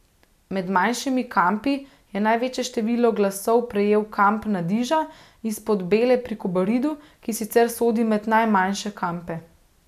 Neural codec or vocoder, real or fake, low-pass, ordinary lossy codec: none; real; 14.4 kHz; none